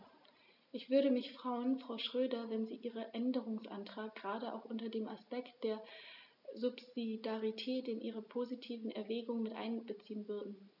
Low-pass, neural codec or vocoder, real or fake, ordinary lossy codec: 5.4 kHz; none; real; none